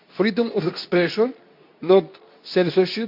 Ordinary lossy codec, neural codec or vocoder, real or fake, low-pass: none; codec, 24 kHz, 0.9 kbps, WavTokenizer, medium speech release version 2; fake; 5.4 kHz